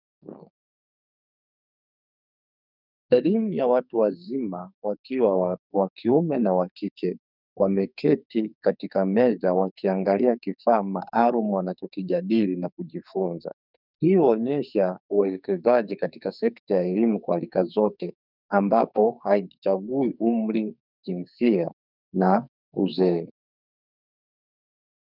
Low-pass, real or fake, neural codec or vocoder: 5.4 kHz; fake; codec, 44.1 kHz, 2.6 kbps, SNAC